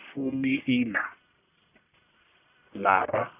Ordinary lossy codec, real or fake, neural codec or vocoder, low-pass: none; fake; codec, 44.1 kHz, 1.7 kbps, Pupu-Codec; 3.6 kHz